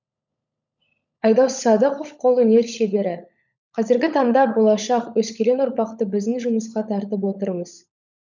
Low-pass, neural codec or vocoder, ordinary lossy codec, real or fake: 7.2 kHz; codec, 16 kHz, 16 kbps, FunCodec, trained on LibriTTS, 50 frames a second; none; fake